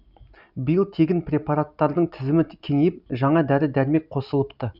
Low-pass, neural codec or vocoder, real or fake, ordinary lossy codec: 5.4 kHz; none; real; none